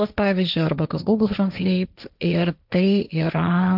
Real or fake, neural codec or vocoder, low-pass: fake; codec, 16 kHz, 1.1 kbps, Voila-Tokenizer; 5.4 kHz